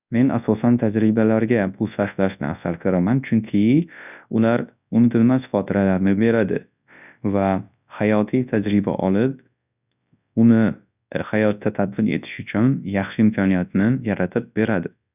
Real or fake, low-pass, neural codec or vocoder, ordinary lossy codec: fake; 3.6 kHz; codec, 24 kHz, 0.9 kbps, WavTokenizer, large speech release; none